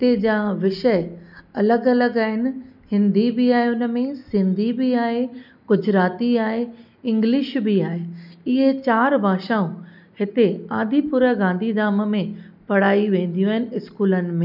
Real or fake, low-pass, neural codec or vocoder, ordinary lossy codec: real; 5.4 kHz; none; none